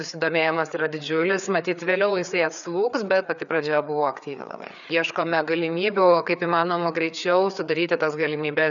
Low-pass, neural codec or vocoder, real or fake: 7.2 kHz; codec, 16 kHz, 4 kbps, FreqCodec, larger model; fake